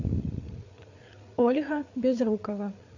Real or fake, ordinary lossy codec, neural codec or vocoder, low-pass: fake; AAC, 48 kbps; codec, 16 kHz, 8 kbps, FreqCodec, larger model; 7.2 kHz